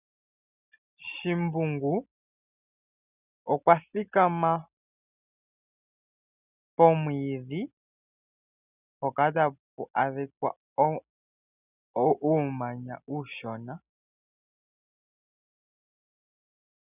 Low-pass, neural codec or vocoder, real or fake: 3.6 kHz; none; real